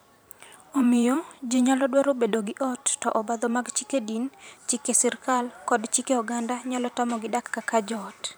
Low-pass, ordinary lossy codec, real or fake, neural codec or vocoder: none; none; fake; vocoder, 44.1 kHz, 128 mel bands every 512 samples, BigVGAN v2